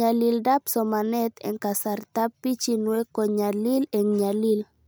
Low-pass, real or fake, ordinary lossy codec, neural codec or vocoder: none; real; none; none